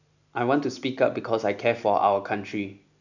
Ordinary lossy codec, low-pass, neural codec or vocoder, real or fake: none; 7.2 kHz; none; real